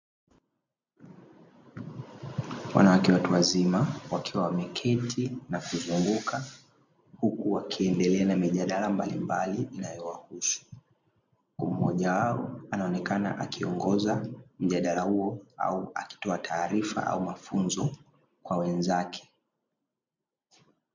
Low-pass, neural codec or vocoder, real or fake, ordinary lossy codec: 7.2 kHz; none; real; MP3, 64 kbps